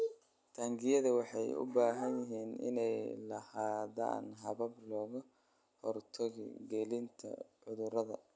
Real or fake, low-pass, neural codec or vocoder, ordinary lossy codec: real; none; none; none